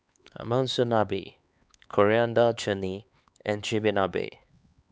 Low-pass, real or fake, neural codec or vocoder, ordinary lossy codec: none; fake; codec, 16 kHz, 4 kbps, X-Codec, HuBERT features, trained on LibriSpeech; none